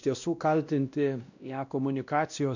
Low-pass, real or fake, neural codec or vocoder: 7.2 kHz; fake; codec, 16 kHz, 1 kbps, X-Codec, WavLM features, trained on Multilingual LibriSpeech